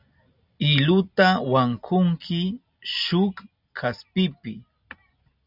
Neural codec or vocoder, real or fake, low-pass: none; real; 5.4 kHz